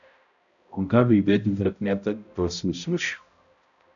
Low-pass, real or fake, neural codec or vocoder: 7.2 kHz; fake; codec, 16 kHz, 0.5 kbps, X-Codec, HuBERT features, trained on balanced general audio